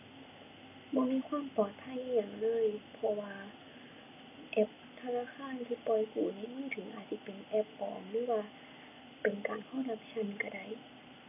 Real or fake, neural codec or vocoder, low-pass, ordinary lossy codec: real; none; 3.6 kHz; none